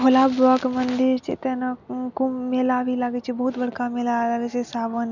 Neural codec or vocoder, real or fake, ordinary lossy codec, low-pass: none; real; none; 7.2 kHz